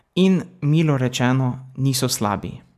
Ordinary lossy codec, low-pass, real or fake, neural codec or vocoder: none; 14.4 kHz; real; none